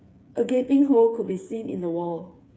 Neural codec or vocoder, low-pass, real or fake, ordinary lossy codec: codec, 16 kHz, 8 kbps, FreqCodec, smaller model; none; fake; none